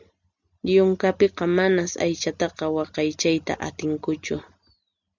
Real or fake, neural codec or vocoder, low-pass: real; none; 7.2 kHz